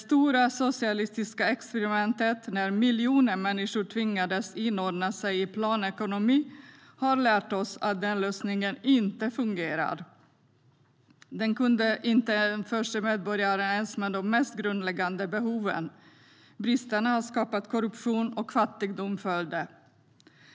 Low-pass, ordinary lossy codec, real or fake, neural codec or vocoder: none; none; real; none